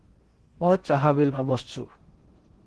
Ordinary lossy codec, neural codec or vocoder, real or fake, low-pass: Opus, 16 kbps; codec, 16 kHz in and 24 kHz out, 0.6 kbps, FocalCodec, streaming, 4096 codes; fake; 10.8 kHz